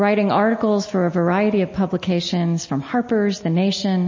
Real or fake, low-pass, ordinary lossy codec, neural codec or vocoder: real; 7.2 kHz; MP3, 32 kbps; none